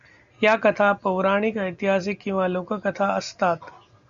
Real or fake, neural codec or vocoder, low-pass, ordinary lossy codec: real; none; 7.2 kHz; Opus, 64 kbps